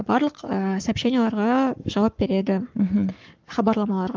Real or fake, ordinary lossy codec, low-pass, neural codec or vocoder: fake; Opus, 32 kbps; 7.2 kHz; codec, 44.1 kHz, 7.8 kbps, Pupu-Codec